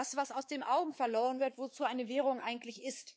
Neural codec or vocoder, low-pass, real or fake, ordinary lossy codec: codec, 16 kHz, 4 kbps, X-Codec, WavLM features, trained on Multilingual LibriSpeech; none; fake; none